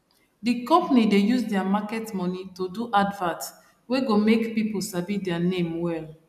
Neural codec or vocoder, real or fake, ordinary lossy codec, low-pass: none; real; none; 14.4 kHz